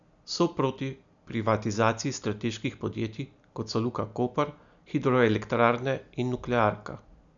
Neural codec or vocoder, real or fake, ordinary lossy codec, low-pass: none; real; MP3, 96 kbps; 7.2 kHz